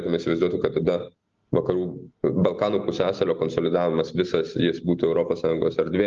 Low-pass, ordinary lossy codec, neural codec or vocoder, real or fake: 7.2 kHz; Opus, 32 kbps; none; real